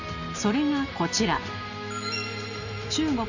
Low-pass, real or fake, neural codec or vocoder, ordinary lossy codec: 7.2 kHz; real; none; none